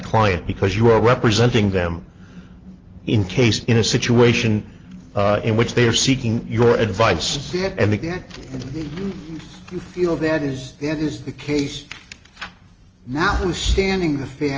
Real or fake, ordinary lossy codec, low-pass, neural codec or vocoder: real; Opus, 24 kbps; 7.2 kHz; none